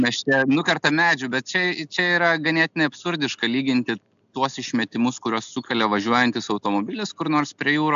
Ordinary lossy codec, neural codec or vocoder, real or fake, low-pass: AAC, 96 kbps; none; real; 7.2 kHz